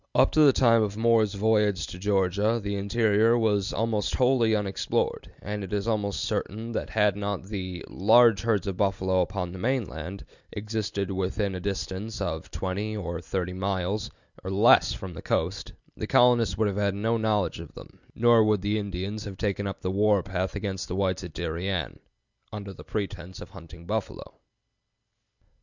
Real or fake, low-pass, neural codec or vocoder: real; 7.2 kHz; none